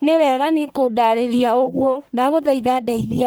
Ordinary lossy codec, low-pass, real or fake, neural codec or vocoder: none; none; fake; codec, 44.1 kHz, 1.7 kbps, Pupu-Codec